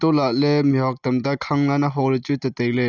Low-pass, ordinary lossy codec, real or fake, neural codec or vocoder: 7.2 kHz; none; real; none